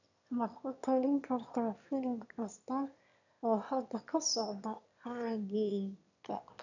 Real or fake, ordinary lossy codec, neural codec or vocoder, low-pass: fake; none; autoencoder, 22.05 kHz, a latent of 192 numbers a frame, VITS, trained on one speaker; 7.2 kHz